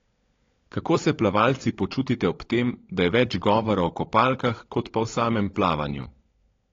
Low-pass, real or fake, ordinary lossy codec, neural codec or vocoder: 7.2 kHz; fake; AAC, 32 kbps; codec, 16 kHz, 16 kbps, FunCodec, trained on LibriTTS, 50 frames a second